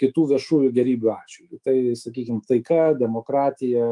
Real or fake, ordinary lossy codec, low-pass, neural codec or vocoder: fake; Opus, 32 kbps; 10.8 kHz; autoencoder, 48 kHz, 128 numbers a frame, DAC-VAE, trained on Japanese speech